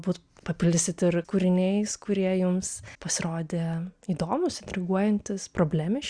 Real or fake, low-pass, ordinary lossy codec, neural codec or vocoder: real; 9.9 kHz; Opus, 64 kbps; none